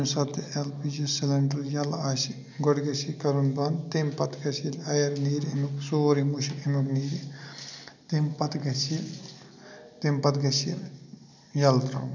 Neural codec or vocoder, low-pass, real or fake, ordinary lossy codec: none; 7.2 kHz; real; none